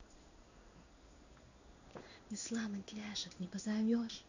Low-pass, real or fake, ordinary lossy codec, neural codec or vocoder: 7.2 kHz; fake; none; codec, 16 kHz in and 24 kHz out, 1 kbps, XY-Tokenizer